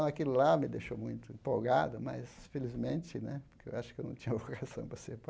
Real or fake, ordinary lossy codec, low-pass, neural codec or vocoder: real; none; none; none